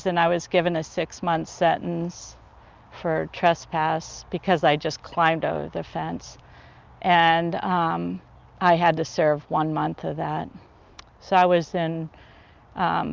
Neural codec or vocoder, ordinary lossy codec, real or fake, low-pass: none; Opus, 24 kbps; real; 7.2 kHz